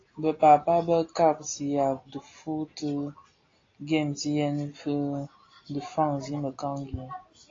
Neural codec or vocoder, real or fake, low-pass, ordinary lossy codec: none; real; 7.2 kHz; AAC, 32 kbps